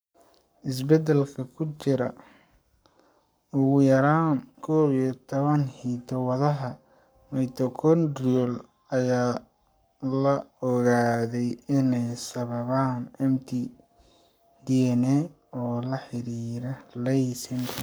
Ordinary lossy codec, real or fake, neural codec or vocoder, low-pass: none; fake; codec, 44.1 kHz, 7.8 kbps, Pupu-Codec; none